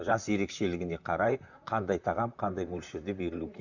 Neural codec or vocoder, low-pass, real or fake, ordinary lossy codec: vocoder, 44.1 kHz, 128 mel bands, Pupu-Vocoder; 7.2 kHz; fake; none